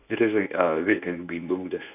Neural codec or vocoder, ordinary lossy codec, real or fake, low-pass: codec, 24 kHz, 0.9 kbps, WavTokenizer, medium speech release version 1; none; fake; 3.6 kHz